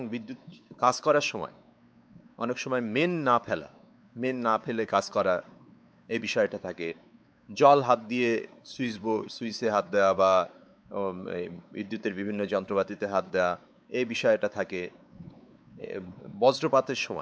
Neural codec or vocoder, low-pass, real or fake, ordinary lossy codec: codec, 16 kHz, 4 kbps, X-Codec, WavLM features, trained on Multilingual LibriSpeech; none; fake; none